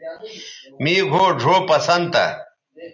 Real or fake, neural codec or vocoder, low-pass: real; none; 7.2 kHz